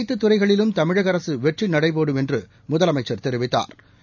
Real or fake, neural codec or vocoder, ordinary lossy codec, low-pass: real; none; none; 7.2 kHz